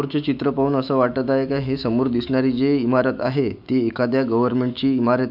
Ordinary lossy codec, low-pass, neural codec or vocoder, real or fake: none; 5.4 kHz; autoencoder, 48 kHz, 128 numbers a frame, DAC-VAE, trained on Japanese speech; fake